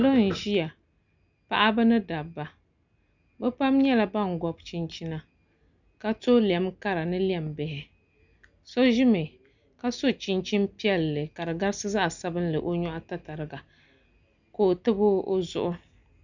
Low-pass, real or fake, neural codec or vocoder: 7.2 kHz; real; none